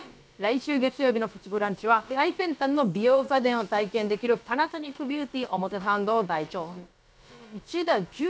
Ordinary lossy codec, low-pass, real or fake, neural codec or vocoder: none; none; fake; codec, 16 kHz, about 1 kbps, DyCAST, with the encoder's durations